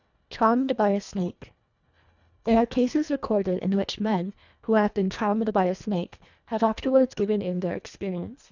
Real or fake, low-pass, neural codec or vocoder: fake; 7.2 kHz; codec, 24 kHz, 1.5 kbps, HILCodec